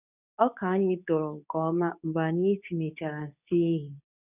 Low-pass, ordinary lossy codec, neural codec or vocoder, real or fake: 3.6 kHz; none; codec, 24 kHz, 0.9 kbps, WavTokenizer, medium speech release version 2; fake